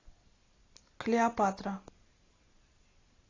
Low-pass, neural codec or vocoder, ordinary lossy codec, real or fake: 7.2 kHz; none; AAC, 32 kbps; real